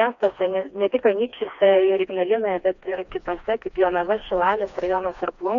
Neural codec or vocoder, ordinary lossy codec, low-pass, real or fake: codec, 16 kHz, 2 kbps, FreqCodec, smaller model; MP3, 96 kbps; 7.2 kHz; fake